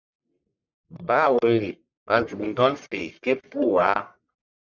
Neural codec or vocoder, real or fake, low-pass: codec, 44.1 kHz, 1.7 kbps, Pupu-Codec; fake; 7.2 kHz